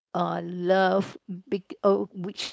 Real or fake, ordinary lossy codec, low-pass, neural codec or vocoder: fake; none; none; codec, 16 kHz, 4.8 kbps, FACodec